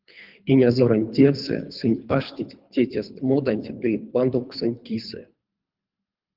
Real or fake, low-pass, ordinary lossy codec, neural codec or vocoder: fake; 5.4 kHz; Opus, 32 kbps; codec, 24 kHz, 3 kbps, HILCodec